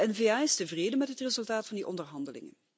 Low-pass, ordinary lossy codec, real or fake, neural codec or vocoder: none; none; real; none